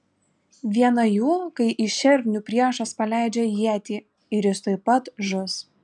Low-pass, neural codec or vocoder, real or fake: 10.8 kHz; none; real